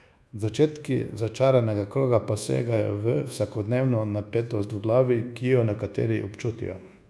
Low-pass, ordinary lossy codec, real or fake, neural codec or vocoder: none; none; fake; codec, 24 kHz, 1.2 kbps, DualCodec